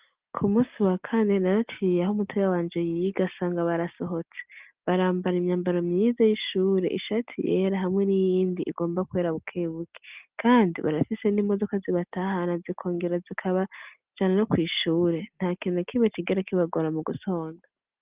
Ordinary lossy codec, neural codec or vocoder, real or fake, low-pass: Opus, 32 kbps; none; real; 3.6 kHz